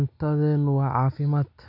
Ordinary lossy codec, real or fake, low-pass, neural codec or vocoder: none; real; 5.4 kHz; none